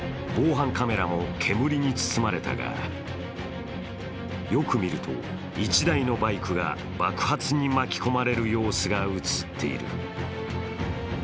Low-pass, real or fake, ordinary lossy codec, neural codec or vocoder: none; real; none; none